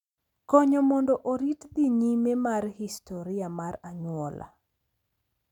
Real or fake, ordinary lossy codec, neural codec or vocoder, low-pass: real; none; none; 19.8 kHz